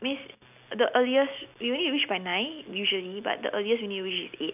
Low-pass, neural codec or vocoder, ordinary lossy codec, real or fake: 3.6 kHz; none; none; real